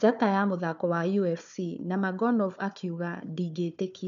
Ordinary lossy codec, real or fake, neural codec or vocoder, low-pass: none; fake; codec, 16 kHz, 4 kbps, FunCodec, trained on Chinese and English, 50 frames a second; 7.2 kHz